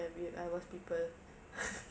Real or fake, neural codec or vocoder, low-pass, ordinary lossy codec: real; none; none; none